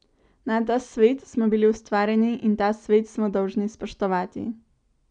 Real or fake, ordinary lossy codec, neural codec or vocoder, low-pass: real; none; none; 9.9 kHz